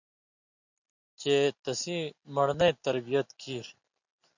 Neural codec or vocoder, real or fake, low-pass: none; real; 7.2 kHz